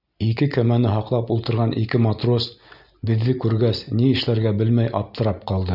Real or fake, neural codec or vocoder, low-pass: real; none; 5.4 kHz